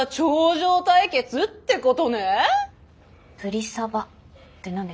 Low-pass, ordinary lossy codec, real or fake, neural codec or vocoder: none; none; real; none